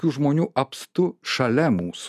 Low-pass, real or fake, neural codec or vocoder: 14.4 kHz; real; none